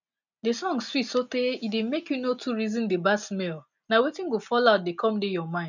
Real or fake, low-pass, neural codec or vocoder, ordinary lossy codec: real; 7.2 kHz; none; none